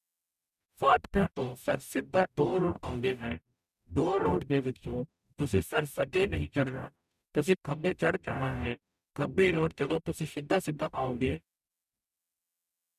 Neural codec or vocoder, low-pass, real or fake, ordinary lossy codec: codec, 44.1 kHz, 0.9 kbps, DAC; 14.4 kHz; fake; none